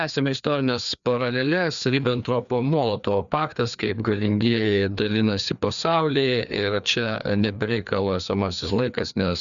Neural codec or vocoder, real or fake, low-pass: codec, 16 kHz, 2 kbps, FreqCodec, larger model; fake; 7.2 kHz